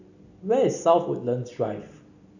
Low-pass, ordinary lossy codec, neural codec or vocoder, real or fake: 7.2 kHz; none; none; real